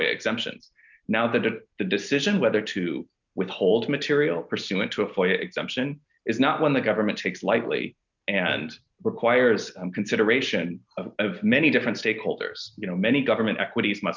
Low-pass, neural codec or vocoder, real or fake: 7.2 kHz; none; real